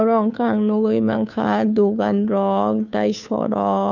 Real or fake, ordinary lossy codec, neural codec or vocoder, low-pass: fake; none; codec, 16 kHz, 2 kbps, FunCodec, trained on Chinese and English, 25 frames a second; 7.2 kHz